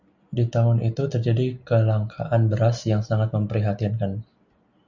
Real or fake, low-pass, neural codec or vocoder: real; 7.2 kHz; none